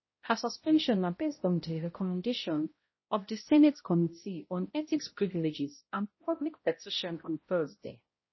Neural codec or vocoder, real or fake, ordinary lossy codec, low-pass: codec, 16 kHz, 0.5 kbps, X-Codec, HuBERT features, trained on balanced general audio; fake; MP3, 24 kbps; 7.2 kHz